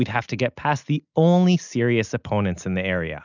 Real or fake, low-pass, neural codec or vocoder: real; 7.2 kHz; none